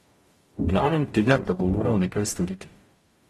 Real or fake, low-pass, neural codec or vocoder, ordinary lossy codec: fake; 19.8 kHz; codec, 44.1 kHz, 0.9 kbps, DAC; AAC, 32 kbps